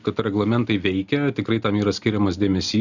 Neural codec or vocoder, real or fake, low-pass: none; real; 7.2 kHz